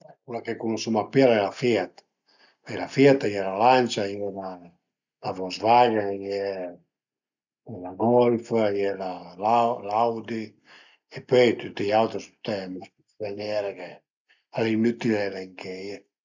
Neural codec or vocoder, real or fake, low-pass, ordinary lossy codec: none; real; 7.2 kHz; none